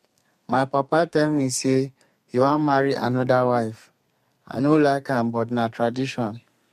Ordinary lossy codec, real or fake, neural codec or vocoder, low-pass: MP3, 64 kbps; fake; codec, 32 kHz, 1.9 kbps, SNAC; 14.4 kHz